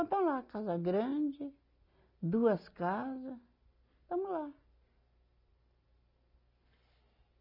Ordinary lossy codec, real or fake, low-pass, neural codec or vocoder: none; real; 5.4 kHz; none